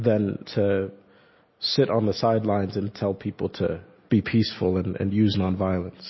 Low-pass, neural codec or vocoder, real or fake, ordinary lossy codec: 7.2 kHz; none; real; MP3, 24 kbps